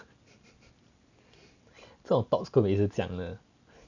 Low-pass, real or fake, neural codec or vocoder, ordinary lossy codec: 7.2 kHz; real; none; none